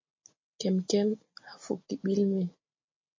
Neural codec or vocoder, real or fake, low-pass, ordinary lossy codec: none; real; 7.2 kHz; MP3, 32 kbps